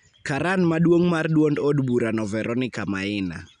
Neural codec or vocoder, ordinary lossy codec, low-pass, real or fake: none; none; 9.9 kHz; real